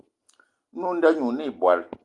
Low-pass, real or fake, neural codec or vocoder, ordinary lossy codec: 10.8 kHz; real; none; Opus, 32 kbps